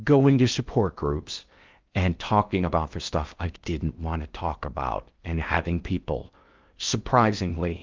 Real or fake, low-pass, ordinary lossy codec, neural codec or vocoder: fake; 7.2 kHz; Opus, 24 kbps; codec, 16 kHz in and 24 kHz out, 0.6 kbps, FocalCodec, streaming, 4096 codes